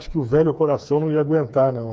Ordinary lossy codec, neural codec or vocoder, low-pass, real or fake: none; codec, 16 kHz, 4 kbps, FreqCodec, smaller model; none; fake